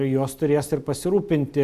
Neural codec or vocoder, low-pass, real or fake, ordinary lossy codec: none; 14.4 kHz; real; Opus, 64 kbps